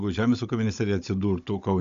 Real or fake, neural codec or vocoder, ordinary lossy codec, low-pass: fake; codec, 16 kHz, 16 kbps, FunCodec, trained on Chinese and English, 50 frames a second; AAC, 64 kbps; 7.2 kHz